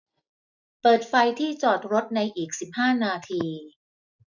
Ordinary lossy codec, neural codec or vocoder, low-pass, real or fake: none; none; 7.2 kHz; real